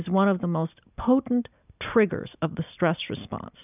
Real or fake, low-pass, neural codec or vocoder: real; 3.6 kHz; none